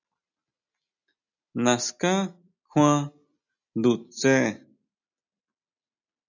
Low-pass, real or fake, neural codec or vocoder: 7.2 kHz; real; none